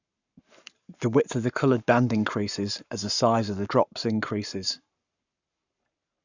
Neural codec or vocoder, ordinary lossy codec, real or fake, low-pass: codec, 44.1 kHz, 7.8 kbps, Pupu-Codec; none; fake; 7.2 kHz